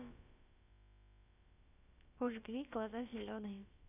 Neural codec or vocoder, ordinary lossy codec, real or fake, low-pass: codec, 16 kHz, about 1 kbps, DyCAST, with the encoder's durations; none; fake; 3.6 kHz